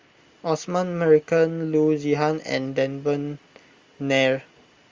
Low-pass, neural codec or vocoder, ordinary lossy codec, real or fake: 7.2 kHz; none; Opus, 32 kbps; real